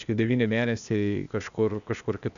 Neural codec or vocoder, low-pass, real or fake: codec, 16 kHz, 0.8 kbps, ZipCodec; 7.2 kHz; fake